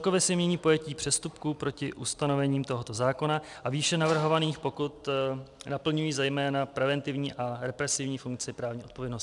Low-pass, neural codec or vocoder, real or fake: 10.8 kHz; none; real